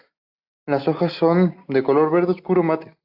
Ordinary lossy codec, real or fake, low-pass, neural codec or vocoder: AAC, 48 kbps; real; 5.4 kHz; none